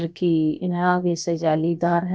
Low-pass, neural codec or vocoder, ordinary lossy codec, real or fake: none; codec, 16 kHz, about 1 kbps, DyCAST, with the encoder's durations; none; fake